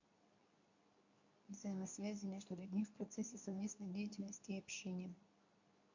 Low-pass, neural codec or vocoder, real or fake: 7.2 kHz; codec, 24 kHz, 0.9 kbps, WavTokenizer, medium speech release version 2; fake